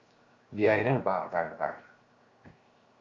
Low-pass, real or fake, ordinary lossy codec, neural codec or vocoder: 7.2 kHz; fake; Opus, 64 kbps; codec, 16 kHz, 0.7 kbps, FocalCodec